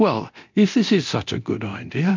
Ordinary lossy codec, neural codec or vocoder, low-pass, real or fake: MP3, 64 kbps; codec, 24 kHz, 0.9 kbps, DualCodec; 7.2 kHz; fake